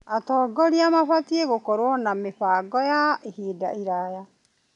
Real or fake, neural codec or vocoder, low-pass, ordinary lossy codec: real; none; 10.8 kHz; none